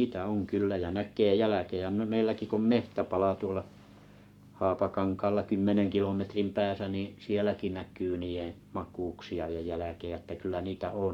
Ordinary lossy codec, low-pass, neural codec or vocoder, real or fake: none; 19.8 kHz; codec, 44.1 kHz, 7.8 kbps, Pupu-Codec; fake